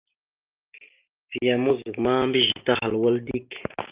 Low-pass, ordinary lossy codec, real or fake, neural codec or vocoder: 3.6 kHz; Opus, 32 kbps; real; none